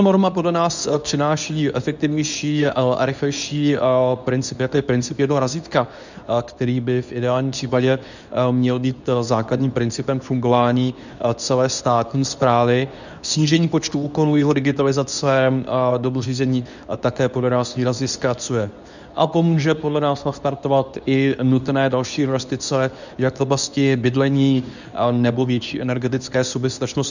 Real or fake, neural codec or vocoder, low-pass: fake; codec, 24 kHz, 0.9 kbps, WavTokenizer, medium speech release version 2; 7.2 kHz